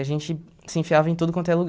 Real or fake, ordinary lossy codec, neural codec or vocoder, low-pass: real; none; none; none